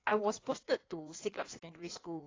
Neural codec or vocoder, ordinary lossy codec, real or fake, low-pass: codec, 16 kHz in and 24 kHz out, 1.1 kbps, FireRedTTS-2 codec; AAC, 32 kbps; fake; 7.2 kHz